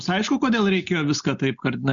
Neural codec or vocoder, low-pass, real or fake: none; 7.2 kHz; real